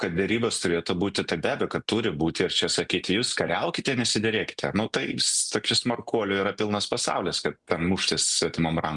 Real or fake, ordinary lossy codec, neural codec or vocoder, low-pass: real; Opus, 64 kbps; none; 10.8 kHz